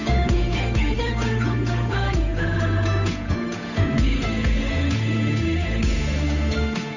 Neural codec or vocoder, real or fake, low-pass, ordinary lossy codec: codec, 16 kHz, 8 kbps, FunCodec, trained on Chinese and English, 25 frames a second; fake; 7.2 kHz; none